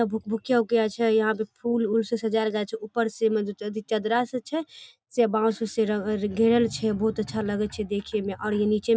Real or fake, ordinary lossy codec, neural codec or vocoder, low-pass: real; none; none; none